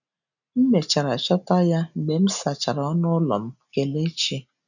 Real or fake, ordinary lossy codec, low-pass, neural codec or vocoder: real; none; 7.2 kHz; none